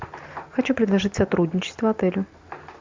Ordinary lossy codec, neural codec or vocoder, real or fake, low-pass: MP3, 64 kbps; none; real; 7.2 kHz